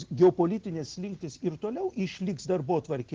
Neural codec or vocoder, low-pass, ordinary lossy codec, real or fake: none; 7.2 kHz; Opus, 16 kbps; real